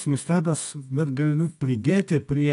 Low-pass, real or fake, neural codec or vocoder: 10.8 kHz; fake; codec, 24 kHz, 0.9 kbps, WavTokenizer, medium music audio release